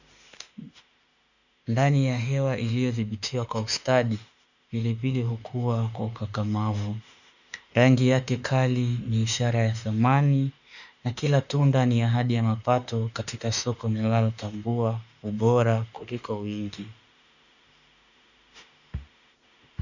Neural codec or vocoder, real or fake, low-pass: autoencoder, 48 kHz, 32 numbers a frame, DAC-VAE, trained on Japanese speech; fake; 7.2 kHz